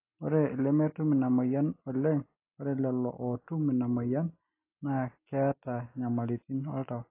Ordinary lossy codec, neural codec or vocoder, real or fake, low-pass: AAC, 24 kbps; none; real; 3.6 kHz